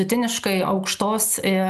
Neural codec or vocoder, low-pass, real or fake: none; 14.4 kHz; real